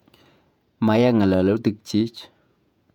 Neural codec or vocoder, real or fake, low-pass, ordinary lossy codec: vocoder, 48 kHz, 128 mel bands, Vocos; fake; 19.8 kHz; none